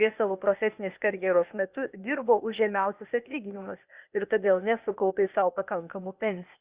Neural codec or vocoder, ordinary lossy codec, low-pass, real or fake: codec, 16 kHz, 0.8 kbps, ZipCodec; Opus, 64 kbps; 3.6 kHz; fake